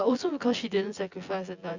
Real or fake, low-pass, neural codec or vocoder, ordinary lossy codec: fake; 7.2 kHz; vocoder, 24 kHz, 100 mel bands, Vocos; Opus, 64 kbps